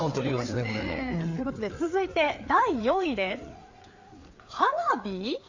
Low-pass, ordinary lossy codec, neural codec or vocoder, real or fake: 7.2 kHz; AAC, 48 kbps; codec, 16 kHz, 4 kbps, FreqCodec, larger model; fake